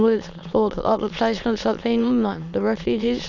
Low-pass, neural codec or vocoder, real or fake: 7.2 kHz; autoencoder, 22.05 kHz, a latent of 192 numbers a frame, VITS, trained on many speakers; fake